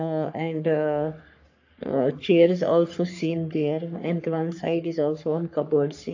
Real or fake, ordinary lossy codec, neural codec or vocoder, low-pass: fake; AAC, 48 kbps; codec, 44.1 kHz, 3.4 kbps, Pupu-Codec; 7.2 kHz